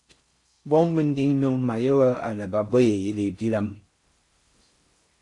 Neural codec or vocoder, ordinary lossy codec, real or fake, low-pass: codec, 16 kHz in and 24 kHz out, 0.6 kbps, FocalCodec, streaming, 4096 codes; AAC, 48 kbps; fake; 10.8 kHz